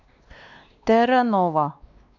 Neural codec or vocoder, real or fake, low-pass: codec, 16 kHz, 2 kbps, X-Codec, WavLM features, trained on Multilingual LibriSpeech; fake; 7.2 kHz